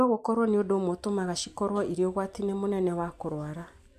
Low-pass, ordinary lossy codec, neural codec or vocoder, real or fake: 14.4 kHz; MP3, 96 kbps; none; real